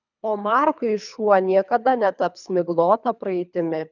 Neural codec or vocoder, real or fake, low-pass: codec, 24 kHz, 3 kbps, HILCodec; fake; 7.2 kHz